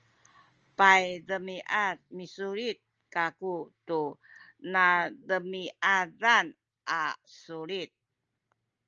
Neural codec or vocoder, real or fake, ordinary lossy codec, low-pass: none; real; Opus, 24 kbps; 7.2 kHz